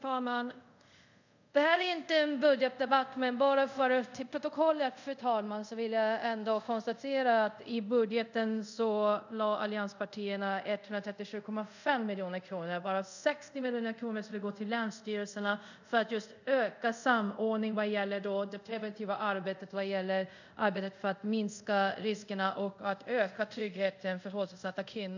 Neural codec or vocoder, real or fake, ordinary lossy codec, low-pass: codec, 24 kHz, 0.5 kbps, DualCodec; fake; none; 7.2 kHz